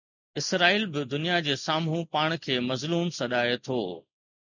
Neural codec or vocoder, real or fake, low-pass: none; real; 7.2 kHz